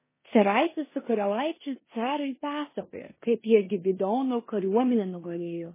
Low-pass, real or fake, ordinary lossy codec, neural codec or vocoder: 3.6 kHz; fake; MP3, 16 kbps; codec, 16 kHz in and 24 kHz out, 0.9 kbps, LongCat-Audio-Codec, four codebook decoder